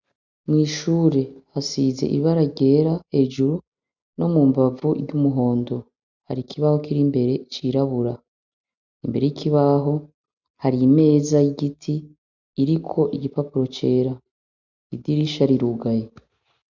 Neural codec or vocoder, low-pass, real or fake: none; 7.2 kHz; real